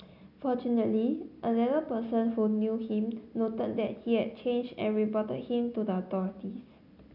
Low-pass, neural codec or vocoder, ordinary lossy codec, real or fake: 5.4 kHz; none; none; real